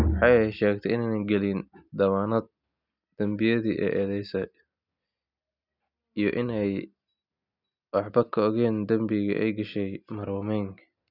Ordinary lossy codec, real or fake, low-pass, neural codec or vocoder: none; real; 5.4 kHz; none